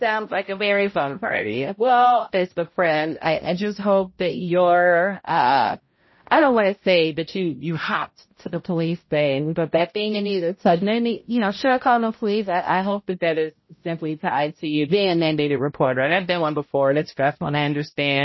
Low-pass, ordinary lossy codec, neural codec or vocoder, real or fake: 7.2 kHz; MP3, 24 kbps; codec, 16 kHz, 0.5 kbps, X-Codec, HuBERT features, trained on balanced general audio; fake